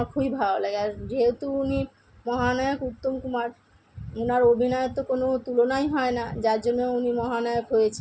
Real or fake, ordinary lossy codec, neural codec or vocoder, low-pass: real; none; none; none